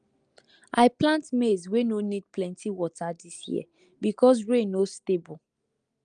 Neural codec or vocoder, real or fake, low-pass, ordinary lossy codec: none; real; 9.9 kHz; Opus, 32 kbps